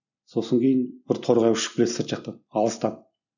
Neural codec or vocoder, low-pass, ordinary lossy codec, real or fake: none; 7.2 kHz; none; real